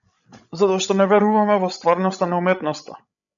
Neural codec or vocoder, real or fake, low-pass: codec, 16 kHz, 16 kbps, FreqCodec, larger model; fake; 7.2 kHz